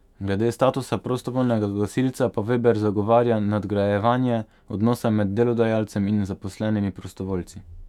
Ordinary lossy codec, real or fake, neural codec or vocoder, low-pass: none; fake; autoencoder, 48 kHz, 128 numbers a frame, DAC-VAE, trained on Japanese speech; 19.8 kHz